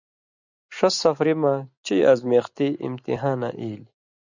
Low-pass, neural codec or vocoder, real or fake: 7.2 kHz; none; real